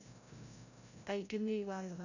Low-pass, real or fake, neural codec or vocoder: 7.2 kHz; fake; codec, 16 kHz, 0.5 kbps, FreqCodec, larger model